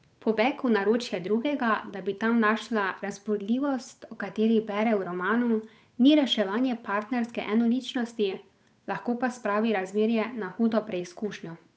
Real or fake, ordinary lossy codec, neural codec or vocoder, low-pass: fake; none; codec, 16 kHz, 8 kbps, FunCodec, trained on Chinese and English, 25 frames a second; none